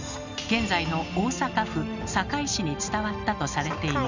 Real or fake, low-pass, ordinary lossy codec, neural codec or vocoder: real; 7.2 kHz; none; none